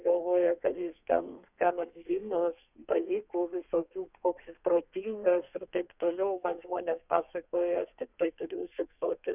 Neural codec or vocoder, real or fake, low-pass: codec, 32 kHz, 1.9 kbps, SNAC; fake; 3.6 kHz